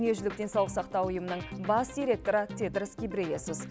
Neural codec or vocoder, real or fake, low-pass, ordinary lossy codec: none; real; none; none